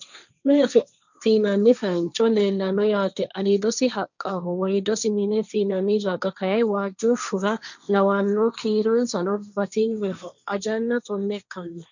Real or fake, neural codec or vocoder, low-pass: fake; codec, 16 kHz, 1.1 kbps, Voila-Tokenizer; 7.2 kHz